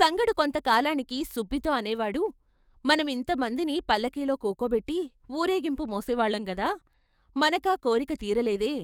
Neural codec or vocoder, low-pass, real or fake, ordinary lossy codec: codec, 44.1 kHz, 7.8 kbps, DAC; 19.8 kHz; fake; none